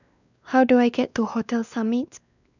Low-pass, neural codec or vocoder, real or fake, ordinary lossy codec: 7.2 kHz; codec, 16 kHz, 2 kbps, X-Codec, WavLM features, trained on Multilingual LibriSpeech; fake; none